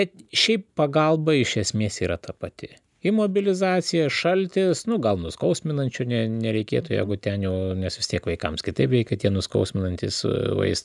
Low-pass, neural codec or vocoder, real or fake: 10.8 kHz; none; real